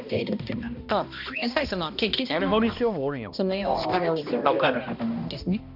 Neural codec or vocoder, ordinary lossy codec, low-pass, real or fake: codec, 16 kHz, 1 kbps, X-Codec, HuBERT features, trained on balanced general audio; none; 5.4 kHz; fake